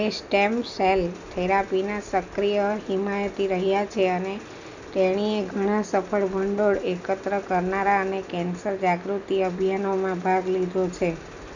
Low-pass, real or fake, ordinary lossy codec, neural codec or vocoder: 7.2 kHz; real; none; none